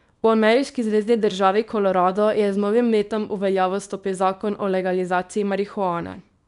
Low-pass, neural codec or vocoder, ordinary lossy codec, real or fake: 10.8 kHz; codec, 24 kHz, 0.9 kbps, WavTokenizer, small release; none; fake